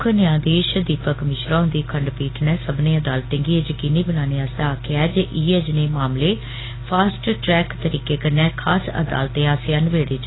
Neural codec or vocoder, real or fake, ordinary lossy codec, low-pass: none; real; AAC, 16 kbps; 7.2 kHz